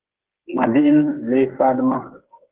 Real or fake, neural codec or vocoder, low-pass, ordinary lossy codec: fake; codec, 16 kHz, 8 kbps, FreqCodec, smaller model; 3.6 kHz; Opus, 16 kbps